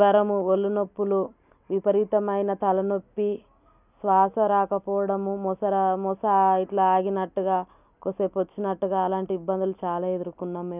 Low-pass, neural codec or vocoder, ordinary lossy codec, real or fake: 3.6 kHz; none; none; real